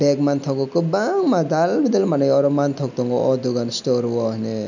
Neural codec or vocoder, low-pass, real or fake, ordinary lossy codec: none; 7.2 kHz; real; none